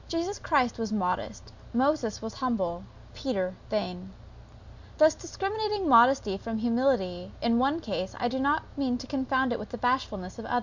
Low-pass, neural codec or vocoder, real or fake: 7.2 kHz; none; real